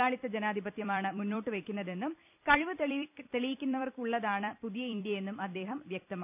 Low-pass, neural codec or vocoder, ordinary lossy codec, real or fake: 3.6 kHz; vocoder, 44.1 kHz, 128 mel bands every 256 samples, BigVGAN v2; none; fake